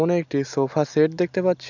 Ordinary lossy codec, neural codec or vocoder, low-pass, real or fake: none; none; 7.2 kHz; real